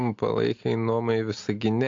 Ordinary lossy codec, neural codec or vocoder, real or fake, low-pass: AAC, 48 kbps; none; real; 7.2 kHz